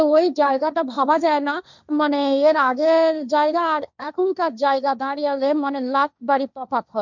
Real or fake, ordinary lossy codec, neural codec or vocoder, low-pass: fake; none; codec, 16 kHz, 1.1 kbps, Voila-Tokenizer; 7.2 kHz